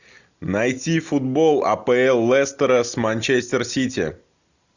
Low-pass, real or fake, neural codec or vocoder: 7.2 kHz; real; none